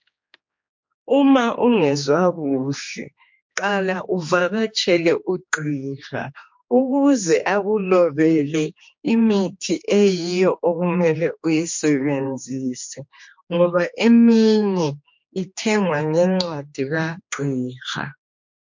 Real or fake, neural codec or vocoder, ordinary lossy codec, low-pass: fake; codec, 16 kHz, 2 kbps, X-Codec, HuBERT features, trained on general audio; MP3, 48 kbps; 7.2 kHz